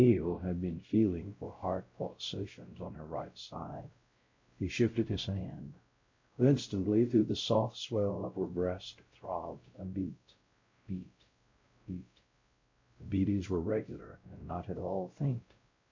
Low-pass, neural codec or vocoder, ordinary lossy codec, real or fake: 7.2 kHz; codec, 16 kHz, 0.5 kbps, X-Codec, WavLM features, trained on Multilingual LibriSpeech; MP3, 48 kbps; fake